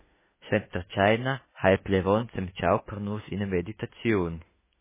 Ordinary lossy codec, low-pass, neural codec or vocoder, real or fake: MP3, 16 kbps; 3.6 kHz; autoencoder, 48 kHz, 32 numbers a frame, DAC-VAE, trained on Japanese speech; fake